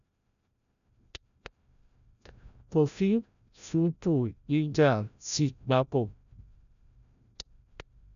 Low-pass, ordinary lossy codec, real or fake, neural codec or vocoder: 7.2 kHz; none; fake; codec, 16 kHz, 0.5 kbps, FreqCodec, larger model